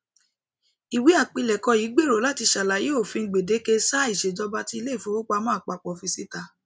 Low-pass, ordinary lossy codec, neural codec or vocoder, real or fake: none; none; none; real